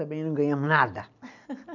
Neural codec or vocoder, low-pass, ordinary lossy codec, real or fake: none; 7.2 kHz; none; real